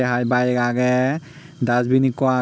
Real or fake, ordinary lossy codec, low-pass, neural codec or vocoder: real; none; none; none